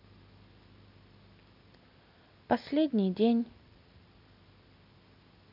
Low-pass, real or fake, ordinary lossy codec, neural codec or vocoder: 5.4 kHz; real; none; none